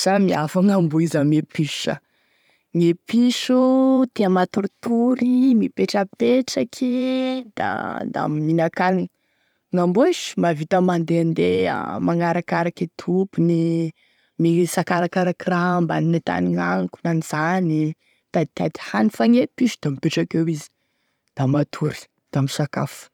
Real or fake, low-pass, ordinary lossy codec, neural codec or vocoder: fake; 19.8 kHz; none; vocoder, 44.1 kHz, 128 mel bands every 512 samples, BigVGAN v2